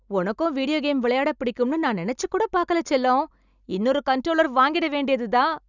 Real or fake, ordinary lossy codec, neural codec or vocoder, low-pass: real; none; none; 7.2 kHz